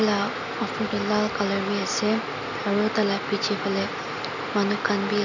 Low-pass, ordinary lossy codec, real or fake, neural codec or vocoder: 7.2 kHz; none; real; none